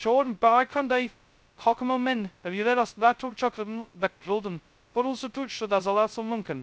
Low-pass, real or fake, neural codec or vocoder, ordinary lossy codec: none; fake; codec, 16 kHz, 0.2 kbps, FocalCodec; none